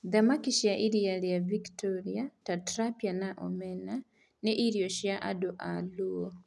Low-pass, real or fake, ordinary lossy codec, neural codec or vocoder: none; real; none; none